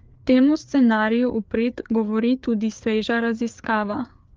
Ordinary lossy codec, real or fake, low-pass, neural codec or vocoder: Opus, 24 kbps; fake; 7.2 kHz; codec, 16 kHz, 4 kbps, FreqCodec, larger model